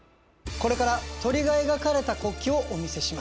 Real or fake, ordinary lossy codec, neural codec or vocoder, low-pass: real; none; none; none